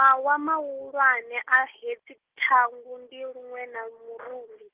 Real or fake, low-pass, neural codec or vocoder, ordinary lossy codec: real; 3.6 kHz; none; Opus, 16 kbps